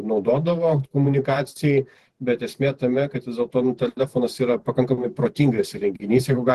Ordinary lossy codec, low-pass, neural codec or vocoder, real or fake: Opus, 16 kbps; 14.4 kHz; vocoder, 48 kHz, 128 mel bands, Vocos; fake